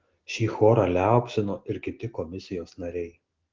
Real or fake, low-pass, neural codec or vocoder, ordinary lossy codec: real; 7.2 kHz; none; Opus, 16 kbps